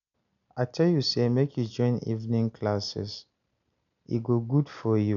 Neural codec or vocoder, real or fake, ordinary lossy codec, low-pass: none; real; none; 7.2 kHz